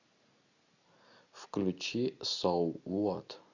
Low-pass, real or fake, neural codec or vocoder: 7.2 kHz; real; none